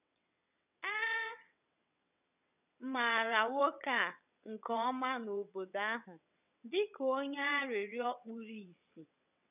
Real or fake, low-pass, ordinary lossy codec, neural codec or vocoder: fake; 3.6 kHz; none; vocoder, 22.05 kHz, 80 mel bands, WaveNeXt